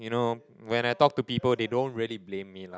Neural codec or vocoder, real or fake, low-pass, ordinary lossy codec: none; real; none; none